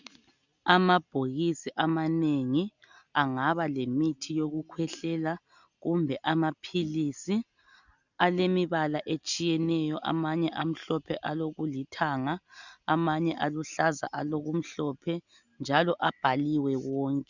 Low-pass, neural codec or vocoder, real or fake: 7.2 kHz; none; real